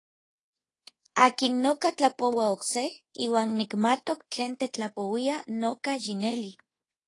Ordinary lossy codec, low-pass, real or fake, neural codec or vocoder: AAC, 32 kbps; 10.8 kHz; fake; codec, 24 kHz, 1.2 kbps, DualCodec